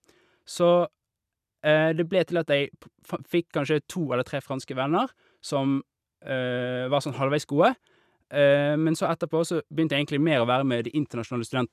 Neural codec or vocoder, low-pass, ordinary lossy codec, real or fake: none; 14.4 kHz; none; real